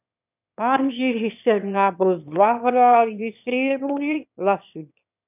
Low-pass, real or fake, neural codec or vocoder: 3.6 kHz; fake; autoencoder, 22.05 kHz, a latent of 192 numbers a frame, VITS, trained on one speaker